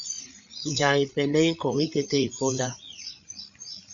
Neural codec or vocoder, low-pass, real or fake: codec, 16 kHz, 8 kbps, FreqCodec, larger model; 7.2 kHz; fake